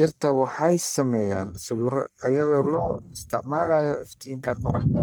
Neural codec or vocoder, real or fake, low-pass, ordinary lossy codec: codec, 44.1 kHz, 1.7 kbps, Pupu-Codec; fake; none; none